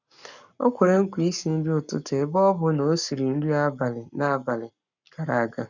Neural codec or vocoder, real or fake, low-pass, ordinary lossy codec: codec, 44.1 kHz, 7.8 kbps, Pupu-Codec; fake; 7.2 kHz; none